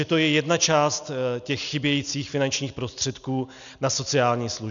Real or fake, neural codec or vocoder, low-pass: real; none; 7.2 kHz